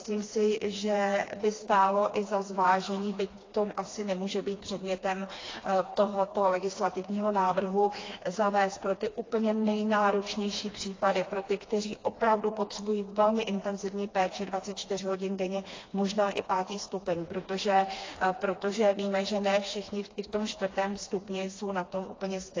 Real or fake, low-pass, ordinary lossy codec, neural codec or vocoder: fake; 7.2 kHz; AAC, 32 kbps; codec, 16 kHz, 2 kbps, FreqCodec, smaller model